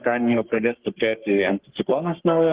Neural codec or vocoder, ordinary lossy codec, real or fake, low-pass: codec, 44.1 kHz, 3.4 kbps, Pupu-Codec; Opus, 16 kbps; fake; 3.6 kHz